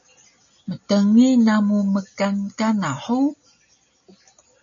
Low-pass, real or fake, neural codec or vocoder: 7.2 kHz; real; none